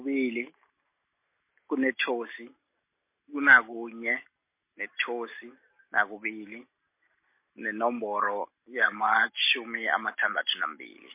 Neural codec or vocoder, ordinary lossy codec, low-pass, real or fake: none; MP3, 24 kbps; 3.6 kHz; real